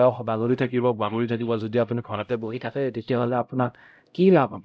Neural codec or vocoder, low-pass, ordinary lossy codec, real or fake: codec, 16 kHz, 0.5 kbps, X-Codec, HuBERT features, trained on LibriSpeech; none; none; fake